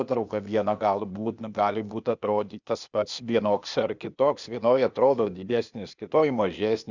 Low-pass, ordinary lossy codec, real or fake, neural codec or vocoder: 7.2 kHz; Opus, 64 kbps; fake; codec, 16 kHz, 0.8 kbps, ZipCodec